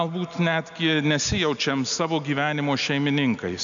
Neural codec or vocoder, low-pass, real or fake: none; 7.2 kHz; real